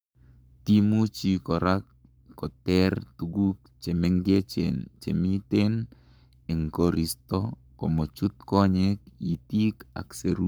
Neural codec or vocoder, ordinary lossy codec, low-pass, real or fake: codec, 44.1 kHz, 7.8 kbps, Pupu-Codec; none; none; fake